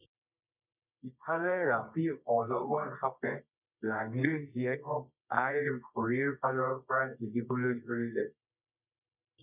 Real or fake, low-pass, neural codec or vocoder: fake; 3.6 kHz; codec, 24 kHz, 0.9 kbps, WavTokenizer, medium music audio release